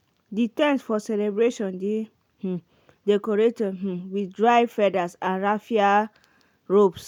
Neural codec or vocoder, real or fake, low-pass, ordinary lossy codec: none; real; 19.8 kHz; none